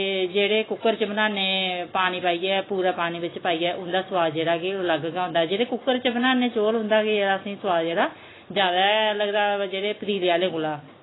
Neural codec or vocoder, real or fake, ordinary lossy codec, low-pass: none; real; AAC, 16 kbps; 7.2 kHz